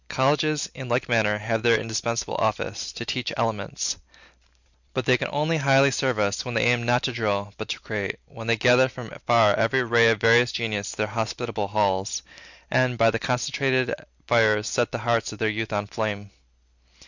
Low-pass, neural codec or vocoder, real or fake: 7.2 kHz; none; real